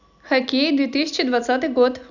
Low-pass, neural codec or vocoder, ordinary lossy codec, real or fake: 7.2 kHz; none; none; real